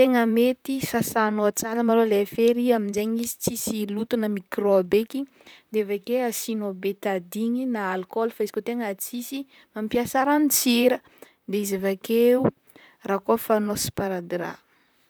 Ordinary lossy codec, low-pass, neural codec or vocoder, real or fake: none; none; vocoder, 44.1 kHz, 128 mel bands, Pupu-Vocoder; fake